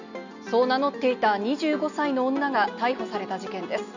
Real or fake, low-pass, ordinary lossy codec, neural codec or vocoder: real; 7.2 kHz; none; none